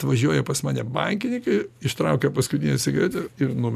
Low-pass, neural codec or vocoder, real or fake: 14.4 kHz; none; real